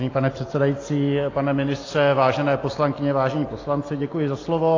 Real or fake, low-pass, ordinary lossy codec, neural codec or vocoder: real; 7.2 kHz; AAC, 32 kbps; none